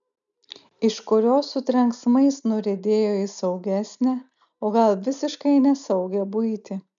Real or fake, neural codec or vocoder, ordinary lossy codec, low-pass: real; none; MP3, 96 kbps; 7.2 kHz